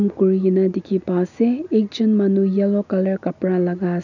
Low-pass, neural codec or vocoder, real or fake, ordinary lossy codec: 7.2 kHz; none; real; none